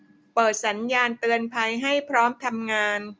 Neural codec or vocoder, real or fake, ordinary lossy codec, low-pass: none; real; none; none